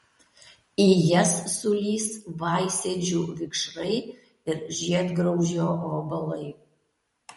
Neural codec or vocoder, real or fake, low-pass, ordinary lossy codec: vocoder, 44.1 kHz, 128 mel bands every 512 samples, BigVGAN v2; fake; 19.8 kHz; MP3, 48 kbps